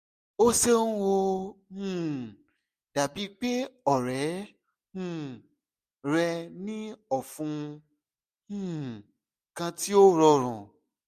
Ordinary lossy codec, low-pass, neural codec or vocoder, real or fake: MP3, 64 kbps; 14.4 kHz; none; real